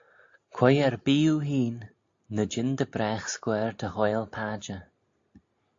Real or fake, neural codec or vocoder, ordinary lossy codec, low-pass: real; none; AAC, 48 kbps; 7.2 kHz